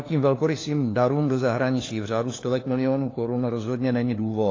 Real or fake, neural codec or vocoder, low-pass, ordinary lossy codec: fake; codec, 16 kHz, 4 kbps, FunCodec, trained on LibriTTS, 50 frames a second; 7.2 kHz; AAC, 32 kbps